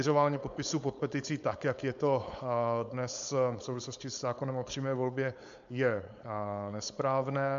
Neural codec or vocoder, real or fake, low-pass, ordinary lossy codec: codec, 16 kHz, 4.8 kbps, FACodec; fake; 7.2 kHz; MP3, 64 kbps